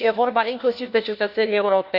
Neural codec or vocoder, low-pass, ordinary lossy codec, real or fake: codec, 16 kHz, 1 kbps, FunCodec, trained on LibriTTS, 50 frames a second; 5.4 kHz; none; fake